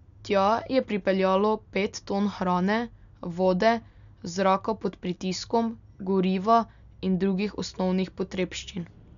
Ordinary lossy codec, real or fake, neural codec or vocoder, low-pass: none; real; none; 7.2 kHz